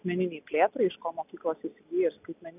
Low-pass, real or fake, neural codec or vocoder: 3.6 kHz; real; none